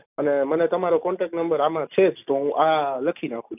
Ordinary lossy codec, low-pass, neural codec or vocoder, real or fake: none; 3.6 kHz; none; real